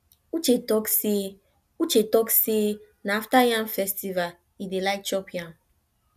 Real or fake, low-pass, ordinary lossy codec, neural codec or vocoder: real; 14.4 kHz; none; none